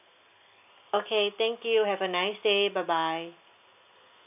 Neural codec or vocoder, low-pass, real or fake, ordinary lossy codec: none; 3.6 kHz; real; none